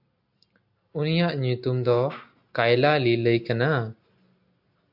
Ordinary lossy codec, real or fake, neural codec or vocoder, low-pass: AAC, 48 kbps; real; none; 5.4 kHz